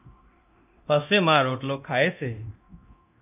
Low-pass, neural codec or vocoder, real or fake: 3.6 kHz; codec, 24 kHz, 0.9 kbps, DualCodec; fake